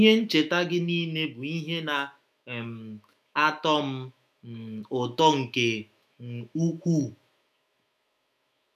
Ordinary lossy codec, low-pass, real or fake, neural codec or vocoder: none; 14.4 kHz; fake; autoencoder, 48 kHz, 128 numbers a frame, DAC-VAE, trained on Japanese speech